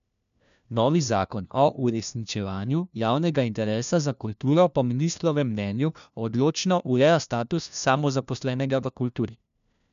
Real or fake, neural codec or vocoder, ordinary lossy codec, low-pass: fake; codec, 16 kHz, 1 kbps, FunCodec, trained on LibriTTS, 50 frames a second; none; 7.2 kHz